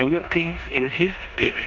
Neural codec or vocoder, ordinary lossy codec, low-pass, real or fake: codec, 16 kHz in and 24 kHz out, 0.9 kbps, LongCat-Audio-Codec, four codebook decoder; MP3, 64 kbps; 7.2 kHz; fake